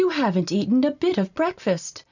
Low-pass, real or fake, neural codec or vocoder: 7.2 kHz; real; none